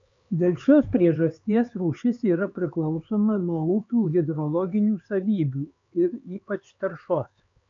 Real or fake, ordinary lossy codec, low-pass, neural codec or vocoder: fake; MP3, 64 kbps; 7.2 kHz; codec, 16 kHz, 4 kbps, X-Codec, HuBERT features, trained on LibriSpeech